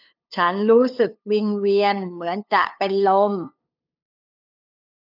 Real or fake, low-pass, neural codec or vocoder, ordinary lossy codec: fake; 5.4 kHz; codec, 16 kHz, 2 kbps, FunCodec, trained on LibriTTS, 25 frames a second; none